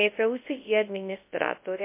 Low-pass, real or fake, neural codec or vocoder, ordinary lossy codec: 3.6 kHz; fake; codec, 24 kHz, 0.9 kbps, WavTokenizer, large speech release; MP3, 24 kbps